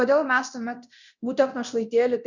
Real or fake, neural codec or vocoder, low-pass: fake; codec, 24 kHz, 0.9 kbps, DualCodec; 7.2 kHz